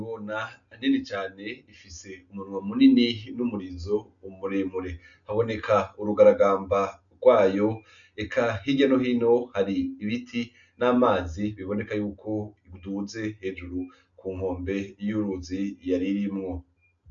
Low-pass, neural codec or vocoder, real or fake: 7.2 kHz; none; real